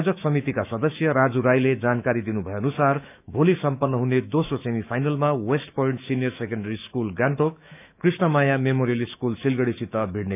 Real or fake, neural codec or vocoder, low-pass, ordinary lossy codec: fake; autoencoder, 48 kHz, 128 numbers a frame, DAC-VAE, trained on Japanese speech; 3.6 kHz; none